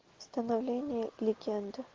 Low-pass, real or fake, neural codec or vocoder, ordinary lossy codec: 7.2 kHz; real; none; Opus, 16 kbps